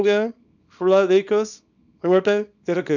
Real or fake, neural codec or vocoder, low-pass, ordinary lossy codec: fake; codec, 24 kHz, 0.9 kbps, WavTokenizer, small release; 7.2 kHz; none